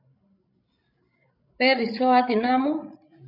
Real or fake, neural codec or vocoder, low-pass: fake; codec, 16 kHz, 16 kbps, FreqCodec, larger model; 5.4 kHz